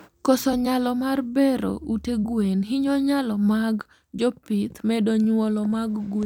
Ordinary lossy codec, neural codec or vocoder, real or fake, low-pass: none; vocoder, 44.1 kHz, 128 mel bands every 256 samples, BigVGAN v2; fake; 19.8 kHz